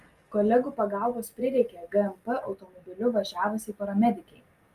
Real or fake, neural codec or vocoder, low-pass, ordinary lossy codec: real; none; 14.4 kHz; Opus, 32 kbps